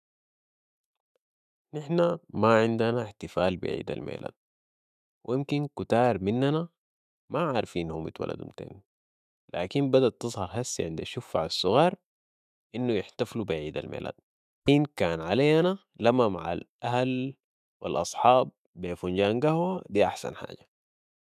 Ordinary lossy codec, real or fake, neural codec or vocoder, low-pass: none; fake; autoencoder, 48 kHz, 128 numbers a frame, DAC-VAE, trained on Japanese speech; 14.4 kHz